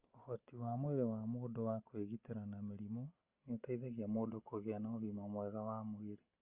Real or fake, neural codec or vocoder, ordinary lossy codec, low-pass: real; none; Opus, 32 kbps; 3.6 kHz